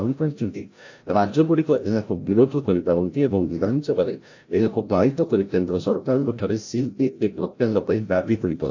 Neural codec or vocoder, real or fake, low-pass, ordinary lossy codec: codec, 16 kHz, 0.5 kbps, FreqCodec, larger model; fake; 7.2 kHz; AAC, 48 kbps